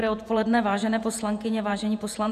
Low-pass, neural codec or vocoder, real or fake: 14.4 kHz; vocoder, 48 kHz, 128 mel bands, Vocos; fake